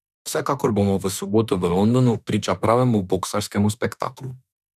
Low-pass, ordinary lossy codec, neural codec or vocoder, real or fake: 14.4 kHz; none; autoencoder, 48 kHz, 32 numbers a frame, DAC-VAE, trained on Japanese speech; fake